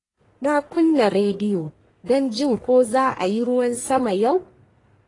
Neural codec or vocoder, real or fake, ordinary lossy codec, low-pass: codec, 44.1 kHz, 1.7 kbps, Pupu-Codec; fake; AAC, 32 kbps; 10.8 kHz